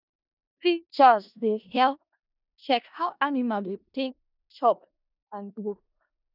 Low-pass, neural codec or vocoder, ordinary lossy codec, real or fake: 5.4 kHz; codec, 16 kHz in and 24 kHz out, 0.4 kbps, LongCat-Audio-Codec, four codebook decoder; none; fake